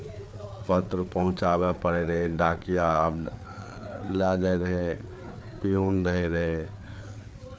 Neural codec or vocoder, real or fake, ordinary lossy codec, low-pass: codec, 16 kHz, 4 kbps, FreqCodec, larger model; fake; none; none